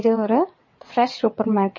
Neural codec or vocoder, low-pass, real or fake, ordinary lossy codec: vocoder, 22.05 kHz, 80 mel bands, WaveNeXt; 7.2 kHz; fake; MP3, 32 kbps